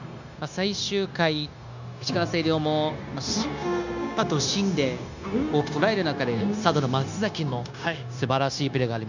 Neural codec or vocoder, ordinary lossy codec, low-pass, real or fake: codec, 16 kHz, 0.9 kbps, LongCat-Audio-Codec; none; 7.2 kHz; fake